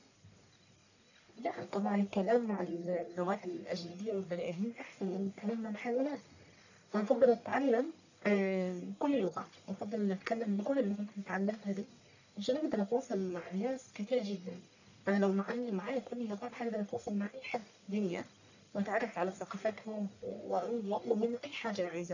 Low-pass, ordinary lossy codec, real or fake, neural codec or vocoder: 7.2 kHz; none; fake; codec, 44.1 kHz, 1.7 kbps, Pupu-Codec